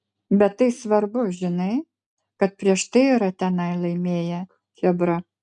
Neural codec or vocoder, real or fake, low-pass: none; real; 10.8 kHz